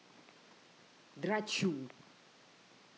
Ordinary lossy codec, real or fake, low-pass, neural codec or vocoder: none; real; none; none